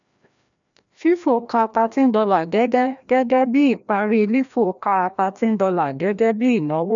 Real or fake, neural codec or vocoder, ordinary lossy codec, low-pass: fake; codec, 16 kHz, 1 kbps, FreqCodec, larger model; none; 7.2 kHz